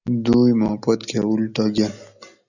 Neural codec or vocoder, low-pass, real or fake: none; 7.2 kHz; real